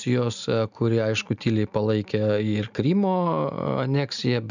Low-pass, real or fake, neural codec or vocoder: 7.2 kHz; real; none